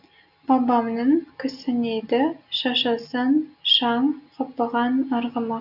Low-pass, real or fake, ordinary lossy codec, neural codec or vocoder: 5.4 kHz; real; none; none